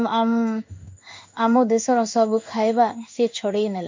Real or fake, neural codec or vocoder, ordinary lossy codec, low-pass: fake; codec, 16 kHz in and 24 kHz out, 1 kbps, XY-Tokenizer; MP3, 48 kbps; 7.2 kHz